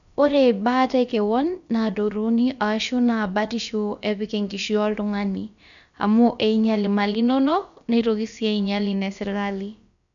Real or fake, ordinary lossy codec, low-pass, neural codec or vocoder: fake; none; 7.2 kHz; codec, 16 kHz, about 1 kbps, DyCAST, with the encoder's durations